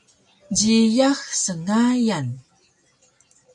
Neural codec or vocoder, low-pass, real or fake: none; 10.8 kHz; real